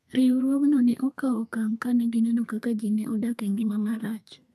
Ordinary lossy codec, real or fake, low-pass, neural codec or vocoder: none; fake; 14.4 kHz; codec, 32 kHz, 1.9 kbps, SNAC